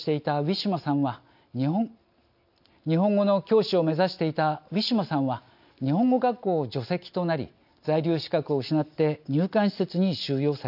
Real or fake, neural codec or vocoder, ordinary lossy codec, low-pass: real; none; AAC, 48 kbps; 5.4 kHz